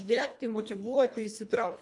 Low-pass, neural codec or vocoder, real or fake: 10.8 kHz; codec, 24 kHz, 1.5 kbps, HILCodec; fake